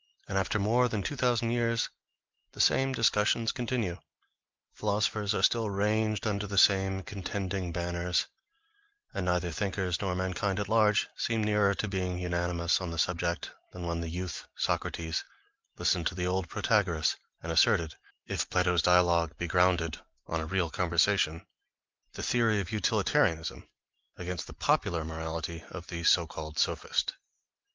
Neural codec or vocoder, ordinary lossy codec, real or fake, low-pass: none; Opus, 32 kbps; real; 7.2 kHz